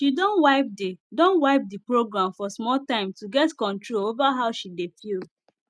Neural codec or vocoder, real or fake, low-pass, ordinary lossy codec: none; real; none; none